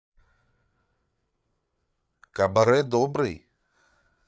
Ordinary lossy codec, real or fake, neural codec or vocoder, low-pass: none; fake; codec, 16 kHz, 8 kbps, FreqCodec, larger model; none